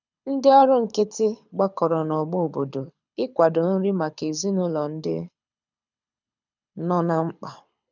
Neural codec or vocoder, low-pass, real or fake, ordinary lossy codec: codec, 24 kHz, 6 kbps, HILCodec; 7.2 kHz; fake; none